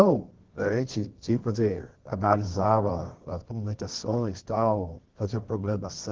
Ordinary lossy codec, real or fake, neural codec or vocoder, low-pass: Opus, 16 kbps; fake; codec, 24 kHz, 0.9 kbps, WavTokenizer, medium music audio release; 7.2 kHz